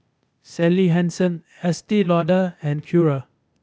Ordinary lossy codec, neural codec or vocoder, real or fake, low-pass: none; codec, 16 kHz, 0.8 kbps, ZipCodec; fake; none